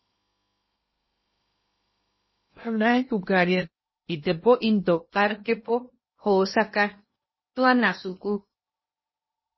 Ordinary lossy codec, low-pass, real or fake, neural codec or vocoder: MP3, 24 kbps; 7.2 kHz; fake; codec, 16 kHz in and 24 kHz out, 0.8 kbps, FocalCodec, streaming, 65536 codes